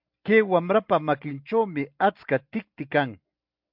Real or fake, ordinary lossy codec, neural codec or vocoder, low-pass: real; MP3, 48 kbps; none; 5.4 kHz